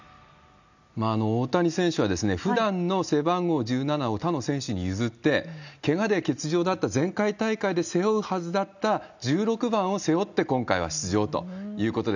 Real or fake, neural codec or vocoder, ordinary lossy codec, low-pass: real; none; none; 7.2 kHz